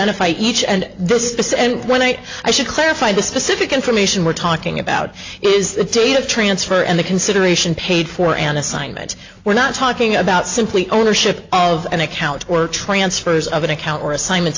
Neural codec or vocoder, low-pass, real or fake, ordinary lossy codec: none; 7.2 kHz; real; AAC, 48 kbps